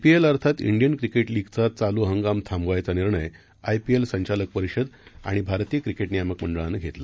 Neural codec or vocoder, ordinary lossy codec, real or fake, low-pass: none; none; real; none